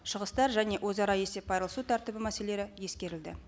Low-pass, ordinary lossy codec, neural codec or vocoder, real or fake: none; none; none; real